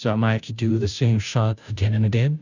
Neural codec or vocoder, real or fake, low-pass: codec, 16 kHz, 0.5 kbps, FunCodec, trained on Chinese and English, 25 frames a second; fake; 7.2 kHz